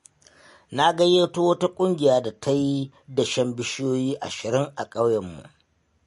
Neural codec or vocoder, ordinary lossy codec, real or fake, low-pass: none; MP3, 48 kbps; real; 14.4 kHz